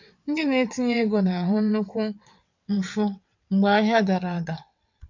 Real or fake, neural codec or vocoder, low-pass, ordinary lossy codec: fake; vocoder, 22.05 kHz, 80 mel bands, WaveNeXt; 7.2 kHz; none